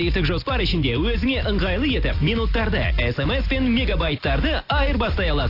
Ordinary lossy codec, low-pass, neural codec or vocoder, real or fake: AAC, 32 kbps; 5.4 kHz; none; real